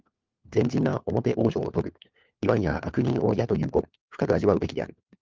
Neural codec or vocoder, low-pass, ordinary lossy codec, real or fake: codec, 16 kHz, 4 kbps, FunCodec, trained on LibriTTS, 50 frames a second; 7.2 kHz; Opus, 32 kbps; fake